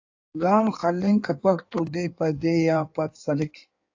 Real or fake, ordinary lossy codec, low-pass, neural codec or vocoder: fake; AAC, 48 kbps; 7.2 kHz; codec, 16 kHz in and 24 kHz out, 1.1 kbps, FireRedTTS-2 codec